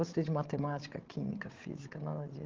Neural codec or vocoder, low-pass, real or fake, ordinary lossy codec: codec, 16 kHz, 16 kbps, FreqCodec, larger model; 7.2 kHz; fake; Opus, 16 kbps